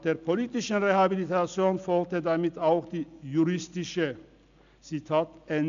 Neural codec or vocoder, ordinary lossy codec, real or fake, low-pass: none; none; real; 7.2 kHz